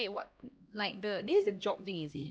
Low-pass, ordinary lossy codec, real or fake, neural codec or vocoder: none; none; fake; codec, 16 kHz, 1 kbps, X-Codec, HuBERT features, trained on LibriSpeech